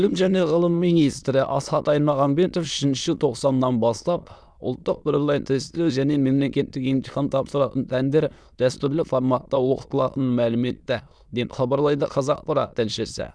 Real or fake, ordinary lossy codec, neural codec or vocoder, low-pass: fake; none; autoencoder, 22.05 kHz, a latent of 192 numbers a frame, VITS, trained on many speakers; none